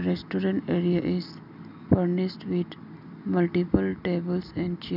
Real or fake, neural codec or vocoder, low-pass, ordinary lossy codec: real; none; 5.4 kHz; none